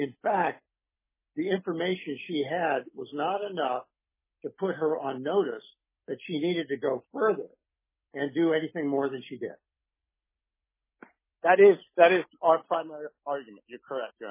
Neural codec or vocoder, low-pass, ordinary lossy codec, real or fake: none; 3.6 kHz; MP3, 16 kbps; real